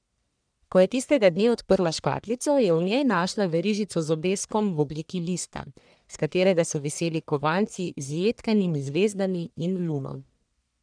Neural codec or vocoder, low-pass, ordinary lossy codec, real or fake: codec, 44.1 kHz, 1.7 kbps, Pupu-Codec; 9.9 kHz; none; fake